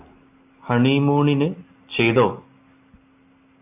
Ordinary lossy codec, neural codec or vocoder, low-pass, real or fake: AAC, 24 kbps; none; 3.6 kHz; real